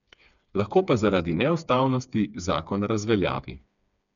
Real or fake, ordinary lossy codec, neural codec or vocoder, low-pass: fake; none; codec, 16 kHz, 4 kbps, FreqCodec, smaller model; 7.2 kHz